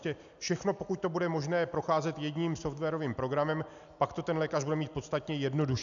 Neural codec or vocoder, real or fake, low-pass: none; real; 7.2 kHz